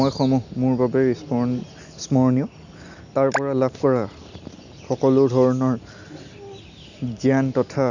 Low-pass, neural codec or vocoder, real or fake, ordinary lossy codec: 7.2 kHz; none; real; none